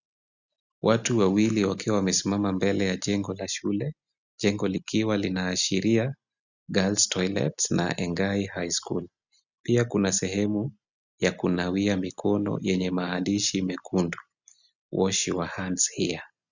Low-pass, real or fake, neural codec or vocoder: 7.2 kHz; real; none